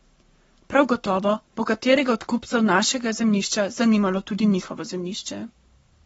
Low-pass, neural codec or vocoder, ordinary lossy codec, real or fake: 19.8 kHz; codec, 44.1 kHz, 7.8 kbps, Pupu-Codec; AAC, 24 kbps; fake